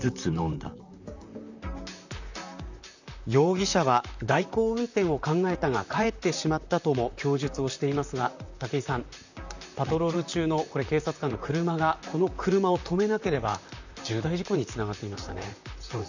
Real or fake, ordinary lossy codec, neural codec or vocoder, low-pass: fake; none; vocoder, 44.1 kHz, 128 mel bands, Pupu-Vocoder; 7.2 kHz